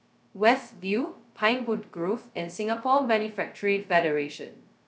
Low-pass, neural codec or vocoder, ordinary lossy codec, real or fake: none; codec, 16 kHz, 0.2 kbps, FocalCodec; none; fake